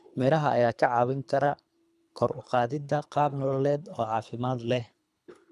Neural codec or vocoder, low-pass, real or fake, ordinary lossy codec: codec, 24 kHz, 3 kbps, HILCodec; none; fake; none